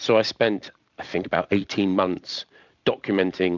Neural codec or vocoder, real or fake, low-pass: vocoder, 44.1 kHz, 128 mel bands every 256 samples, BigVGAN v2; fake; 7.2 kHz